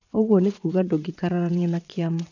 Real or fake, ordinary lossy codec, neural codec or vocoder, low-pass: fake; none; vocoder, 22.05 kHz, 80 mel bands, WaveNeXt; 7.2 kHz